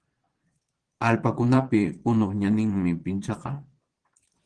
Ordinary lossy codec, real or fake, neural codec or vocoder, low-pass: Opus, 16 kbps; fake; vocoder, 22.05 kHz, 80 mel bands, Vocos; 9.9 kHz